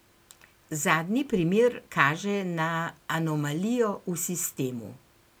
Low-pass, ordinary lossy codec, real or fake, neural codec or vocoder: none; none; real; none